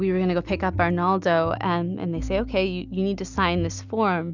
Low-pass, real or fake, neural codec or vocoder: 7.2 kHz; real; none